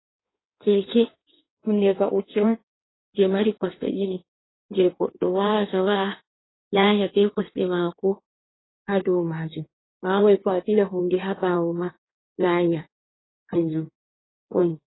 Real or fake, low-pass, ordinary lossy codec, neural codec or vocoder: fake; 7.2 kHz; AAC, 16 kbps; codec, 16 kHz in and 24 kHz out, 1.1 kbps, FireRedTTS-2 codec